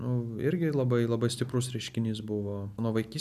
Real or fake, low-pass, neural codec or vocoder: real; 14.4 kHz; none